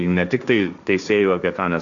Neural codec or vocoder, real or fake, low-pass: codec, 16 kHz, 1.1 kbps, Voila-Tokenizer; fake; 7.2 kHz